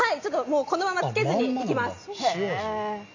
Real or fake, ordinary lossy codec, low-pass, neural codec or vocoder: real; none; 7.2 kHz; none